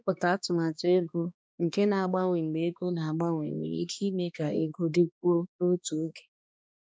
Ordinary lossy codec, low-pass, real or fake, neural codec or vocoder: none; none; fake; codec, 16 kHz, 2 kbps, X-Codec, HuBERT features, trained on balanced general audio